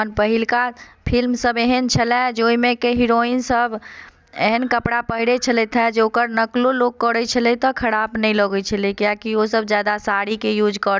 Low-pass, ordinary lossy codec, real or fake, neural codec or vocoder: none; none; real; none